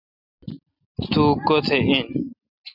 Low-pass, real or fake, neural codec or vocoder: 5.4 kHz; real; none